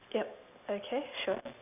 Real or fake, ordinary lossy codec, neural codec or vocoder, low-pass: real; none; none; 3.6 kHz